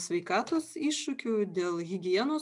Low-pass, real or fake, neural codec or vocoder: 10.8 kHz; real; none